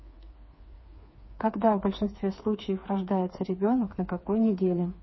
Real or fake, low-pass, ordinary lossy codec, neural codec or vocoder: fake; 7.2 kHz; MP3, 24 kbps; codec, 16 kHz, 4 kbps, FreqCodec, smaller model